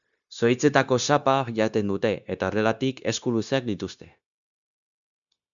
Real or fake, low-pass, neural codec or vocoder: fake; 7.2 kHz; codec, 16 kHz, 0.9 kbps, LongCat-Audio-Codec